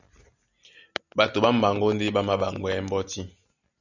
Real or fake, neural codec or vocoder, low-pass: real; none; 7.2 kHz